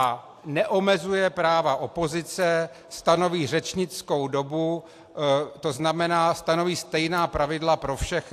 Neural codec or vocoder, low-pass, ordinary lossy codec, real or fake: none; 14.4 kHz; AAC, 64 kbps; real